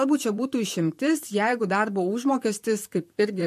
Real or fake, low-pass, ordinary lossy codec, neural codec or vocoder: fake; 14.4 kHz; MP3, 64 kbps; vocoder, 44.1 kHz, 128 mel bands, Pupu-Vocoder